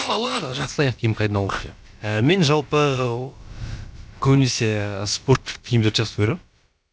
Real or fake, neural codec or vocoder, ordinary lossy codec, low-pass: fake; codec, 16 kHz, about 1 kbps, DyCAST, with the encoder's durations; none; none